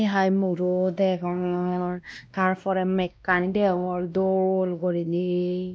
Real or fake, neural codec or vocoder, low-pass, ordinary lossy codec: fake; codec, 16 kHz, 1 kbps, X-Codec, WavLM features, trained on Multilingual LibriSpeech; none; none